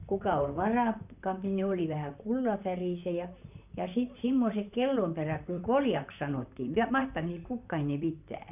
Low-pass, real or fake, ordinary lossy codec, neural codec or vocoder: 3.6 kHz; fake; none; vocoder, 44.1 kHz, 128 mel bands, Pupu-Vocoder